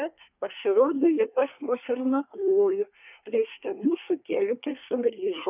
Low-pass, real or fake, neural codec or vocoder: 3.6 kHz; fake; codec, 24 kHz, 1 kbps, SNAC